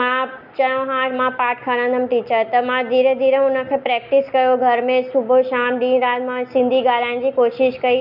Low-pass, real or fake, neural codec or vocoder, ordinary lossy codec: 5.4 kHz; real; none; none